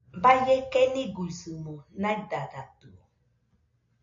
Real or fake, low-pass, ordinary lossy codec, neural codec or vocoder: real; 7.2 kHz; MP3, 48 kbps; none